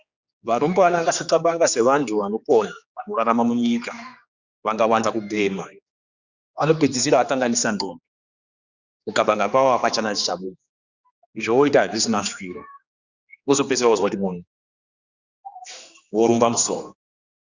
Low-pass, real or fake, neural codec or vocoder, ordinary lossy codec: 7.2 kHz; fake; codec, 16 kHz, 2 kbps, X-Codec, HuBERT features, trained on general audio; Opus, 64 kbps